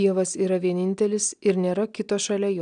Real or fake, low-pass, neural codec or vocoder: real; 9.9 kHz; none